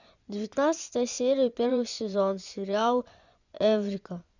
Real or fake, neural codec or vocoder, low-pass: fake; vocoder, 24 kHz, 100 mel bands, Vocos; 7.2 kHz